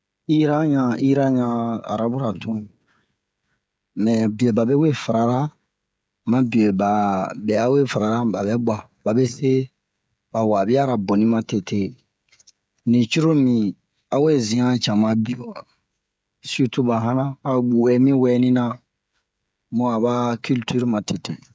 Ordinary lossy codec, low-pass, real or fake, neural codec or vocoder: none; none; fake; codec, 16 kHz, 16 kbps, FreqCodec, smaller model